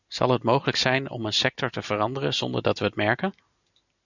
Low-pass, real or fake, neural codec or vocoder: 7.2 kHz; real; none